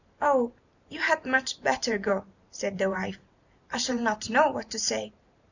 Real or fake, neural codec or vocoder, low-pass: fake; vocoder, 44.1 kHz, 128 mel bands every 512 samples, BigVGAN v2; 7.2 kHz